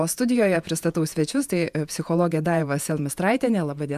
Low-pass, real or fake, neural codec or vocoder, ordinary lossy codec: 14.4 kHz; fake; vocoder, 48 kHz, 128 mel bands, Vocos; MP3, 96 kbps